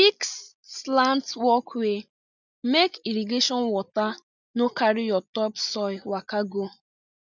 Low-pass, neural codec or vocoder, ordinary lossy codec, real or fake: 7.2 kHz; none; none; real